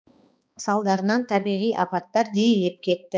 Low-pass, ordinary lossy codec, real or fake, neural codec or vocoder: none; none; fake; codec, 16 kHz, 2 kbps, X-Codec, HuBERT features, trained on balanced general audio